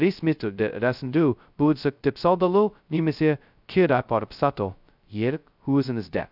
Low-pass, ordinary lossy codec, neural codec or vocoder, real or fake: 5.4 kHz; none; codec, 16 kHz, 0.2 kbps, FocalCodec; fake